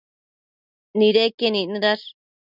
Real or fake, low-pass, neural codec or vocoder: real; 5.4 kHz; none